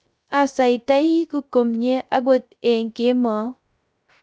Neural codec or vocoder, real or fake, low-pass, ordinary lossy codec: codec, 16 kHz, 0.3 kbps, FocalCodec; fake; none; none